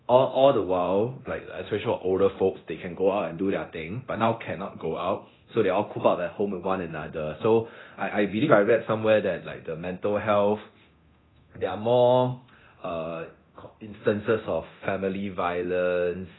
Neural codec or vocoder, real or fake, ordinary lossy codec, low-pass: codec, 24 kHz, 0.9 kbps, DualCodec; fake; AAC, 16 kbps; 7.2 kHz